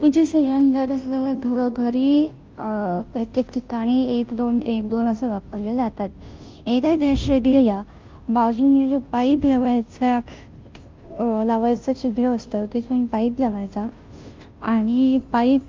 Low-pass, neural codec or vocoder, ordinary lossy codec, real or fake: 7.2 kHz; codec, 16 kHz, 0.5 kbps, FunCodec, trained on Chinese and English, 25 frames a second; Opus, 24 kbps; fake